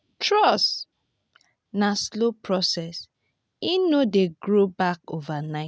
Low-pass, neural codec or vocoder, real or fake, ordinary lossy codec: none; none; real; none